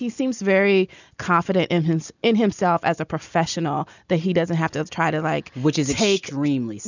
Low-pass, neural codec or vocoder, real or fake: 7.2 kHz; none; real